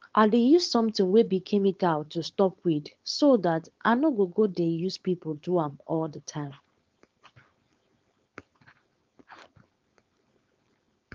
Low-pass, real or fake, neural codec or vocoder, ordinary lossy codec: 7.2 kHz; fake; codec, 16 kHz, 4.8 kbps, FACodec; Opus, 32 kbps